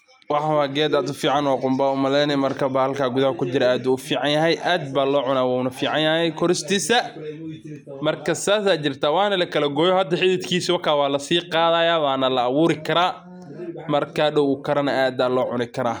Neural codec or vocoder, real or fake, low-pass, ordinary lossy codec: none; real; none; none